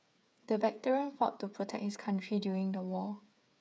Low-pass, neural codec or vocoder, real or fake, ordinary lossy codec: none; codec, 16 kHz, 8 kbps, FreqCodec, smaller model; fake; none